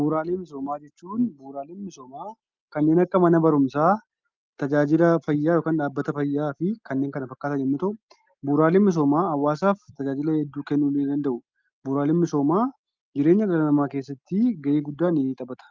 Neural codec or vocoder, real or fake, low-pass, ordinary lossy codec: none; real; 7.2 kHz; Opus, 24 kbps